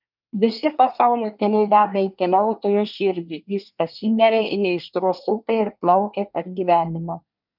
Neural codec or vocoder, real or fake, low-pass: codec, 24 kHz, 1 kbps, SNAC; fake; 5.4 kHz